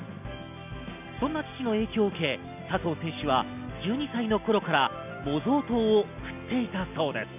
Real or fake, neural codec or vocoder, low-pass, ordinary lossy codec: real; none; 3.6 kHz; none